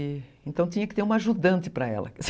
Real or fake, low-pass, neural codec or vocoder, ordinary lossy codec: real; none; none; none